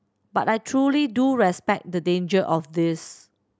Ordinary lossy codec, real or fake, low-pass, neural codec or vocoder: none; real; none; none